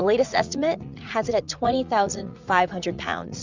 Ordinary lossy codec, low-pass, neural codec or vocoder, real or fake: Opus, 64 kbps; 7.2 kHz; vocoder, 44.1 kHz, 80 mel bands, Vocos; fake